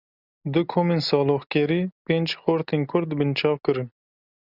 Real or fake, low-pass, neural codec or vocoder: real; 5.4 kHz; none